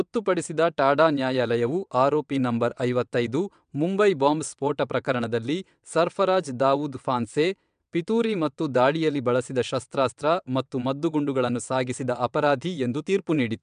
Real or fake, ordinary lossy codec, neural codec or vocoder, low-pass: fake; MP3, 96 kbps; vocoder, 22.05 kHz, 80 mel bands, WaveNeXt; 9.9 kHz